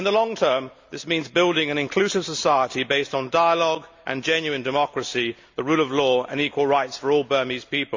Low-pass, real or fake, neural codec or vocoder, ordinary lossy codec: 7.2 kHz; real; none; MP3, 64 kbps